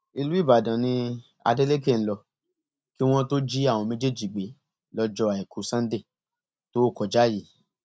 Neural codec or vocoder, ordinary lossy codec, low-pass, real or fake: none; none; none; real